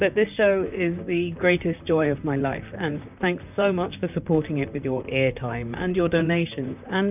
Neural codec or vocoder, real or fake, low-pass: vocoder, 44.1 kHz, 128 mel bands, Pupu-Vocoder; fake; 3.6 kHz